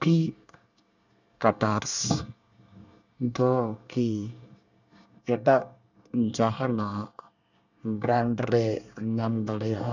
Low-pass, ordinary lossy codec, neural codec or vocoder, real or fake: 7.2 kHz; none; codec, 24 kHz, 1 kbps, SNAC; fake